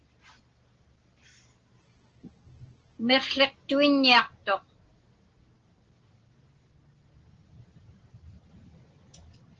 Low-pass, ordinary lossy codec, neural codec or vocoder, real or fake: 7.2 kHz; Opus, 16 kbps; none; real